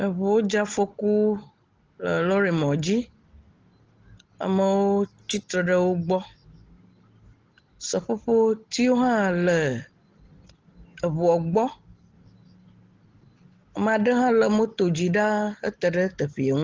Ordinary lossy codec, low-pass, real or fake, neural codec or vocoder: Opus, 16 kbps; 7.2 kHz; real; none